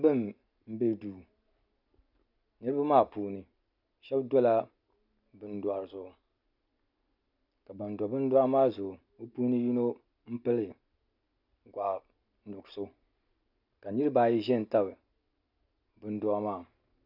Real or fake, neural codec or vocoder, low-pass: real; none; 5.4 kHz